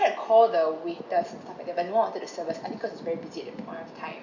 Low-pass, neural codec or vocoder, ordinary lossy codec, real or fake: 7.2 kHz; none; Opus, 64 kbps; real